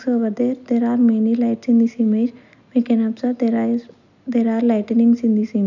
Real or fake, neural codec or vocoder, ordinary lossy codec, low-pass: real; none; none; 7.2 kHz